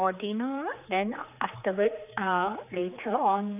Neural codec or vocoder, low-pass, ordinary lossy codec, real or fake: codec, 16 kHz, 4 kbps, X-Codec, HuBERT features, trained on general audio; 3.6 kHz; none; fake